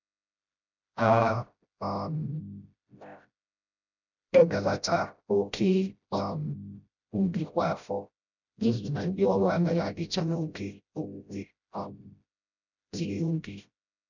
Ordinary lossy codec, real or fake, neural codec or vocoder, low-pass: none; fake; codec, 16 kHz, 0.5 kbps, FreqCodec, smaller model; 7.2 kHz